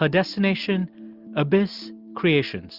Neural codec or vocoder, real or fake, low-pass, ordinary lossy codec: none; real; 5.4 kHz; Opus, 24 kbps